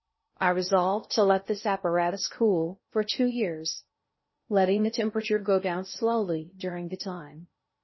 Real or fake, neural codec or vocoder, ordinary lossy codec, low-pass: fake; codec, 16 kHz in and 24 kHz out, 0.6 kbps, FocalCodec, streaming, 2048 codes; MP3, 24 kbps; 7.2 kHz